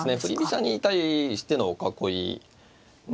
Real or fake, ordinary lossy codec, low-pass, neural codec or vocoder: real; none; none; none